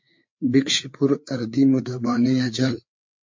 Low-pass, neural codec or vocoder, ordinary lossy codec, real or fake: 7.2 kHz; codec, 16 kHz, 4 kbps, FreqCodec, larger model; MP3, 48 kbps; fake